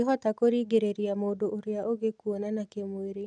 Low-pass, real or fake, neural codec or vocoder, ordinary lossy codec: 9.9 kHz; real; none; none